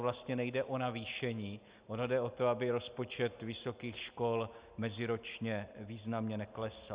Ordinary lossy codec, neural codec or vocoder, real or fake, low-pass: Opus, 64 kbps; none; real; 3.6 kHz